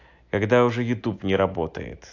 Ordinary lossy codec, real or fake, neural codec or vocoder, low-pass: AAC, 48 kbps; real; none; 7.2 kHz